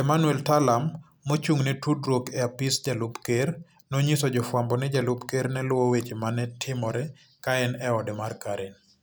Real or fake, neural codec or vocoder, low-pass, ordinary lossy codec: real; none; none; none